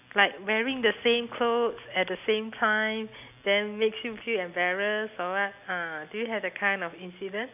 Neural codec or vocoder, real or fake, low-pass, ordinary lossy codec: none; real; 3.6 kHz; none